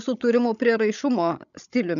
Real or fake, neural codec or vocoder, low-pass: fake; codec, 16 kHz, 16 kbps, FreqCodec, larger model; 7.2 kHz